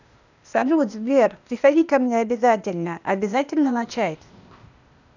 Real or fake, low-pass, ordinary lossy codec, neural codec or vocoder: fake; 7.2 kHz; none; codec, 16 kHz, 0.8 kbps, ZipCodec